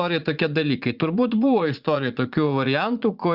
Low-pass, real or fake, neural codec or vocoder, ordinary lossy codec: 5.4 kHz; fake; vocoder, 44.1 kHz, 80 mel bands, Vocos; Opus, 64 kbps